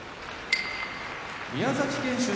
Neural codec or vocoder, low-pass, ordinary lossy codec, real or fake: none; none; none; real